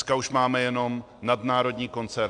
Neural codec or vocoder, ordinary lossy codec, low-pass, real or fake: none; AAC, 64 kbps; 9.9 kHz; real